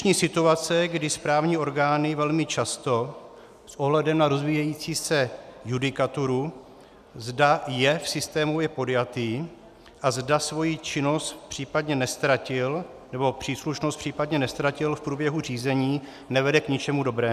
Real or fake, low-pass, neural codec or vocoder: real; 14.4 kHz; none